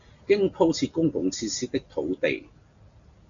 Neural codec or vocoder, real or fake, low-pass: none; real; 7.2 kHz